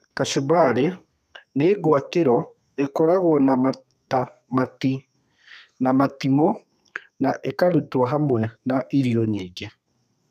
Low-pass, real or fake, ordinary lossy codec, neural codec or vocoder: 14.4 kHz; fake; none; codec, 32 kHz, 1.9 kbps, SNAC